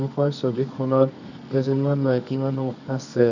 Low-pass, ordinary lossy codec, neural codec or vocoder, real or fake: 7.2 kHz; none; codec, 24 kHz, 0.9 kbps, WavTokenizer, medium music audio release; fake